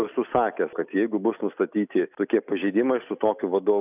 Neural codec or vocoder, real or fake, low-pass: none; real; 3.6 kHz